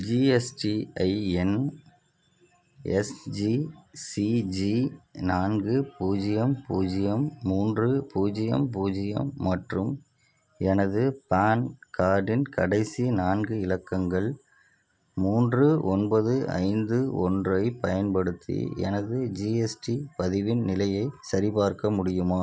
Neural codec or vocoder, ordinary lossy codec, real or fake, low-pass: none; none; real; none